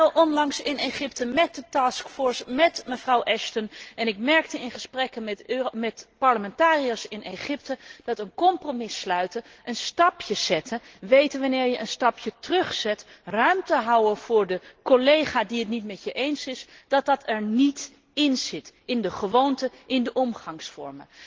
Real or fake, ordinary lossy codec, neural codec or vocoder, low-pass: real; Opus, 16 kbps; none; 7.2 kHz